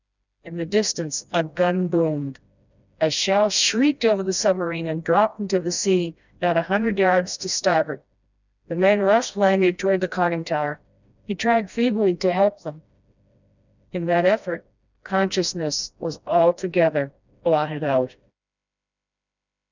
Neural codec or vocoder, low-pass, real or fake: codec, 16 kHz, 1 kbps, FreqCodec, smaller model; 7.2 kHz; fake